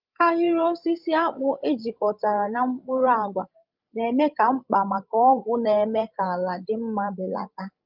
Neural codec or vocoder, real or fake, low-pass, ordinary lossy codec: codec, 16 kHz, 16 kbps, FreqCodec, larger model; fake; 5.4 kHz; Opus, 24 kbps